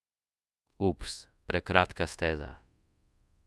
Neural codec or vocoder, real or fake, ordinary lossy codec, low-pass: codec, 24 kHz, 0.5 kbps, DualCodec; fake; none; none